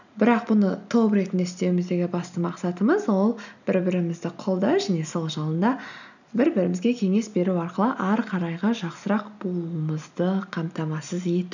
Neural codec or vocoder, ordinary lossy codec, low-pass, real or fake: none; none; 7.2 kHz; real